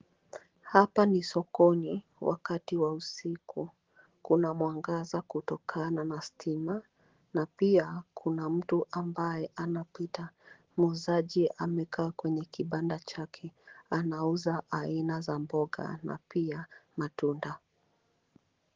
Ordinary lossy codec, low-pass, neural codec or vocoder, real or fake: Opus, 16 kbps; 7.2 kHz; none; real